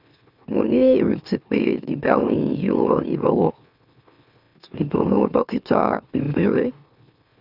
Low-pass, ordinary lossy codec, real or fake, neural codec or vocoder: 5.4 kHz; none; fake; autoencoder, 44.1 kHz, a latent of 192 numbers a frame, MeloTTS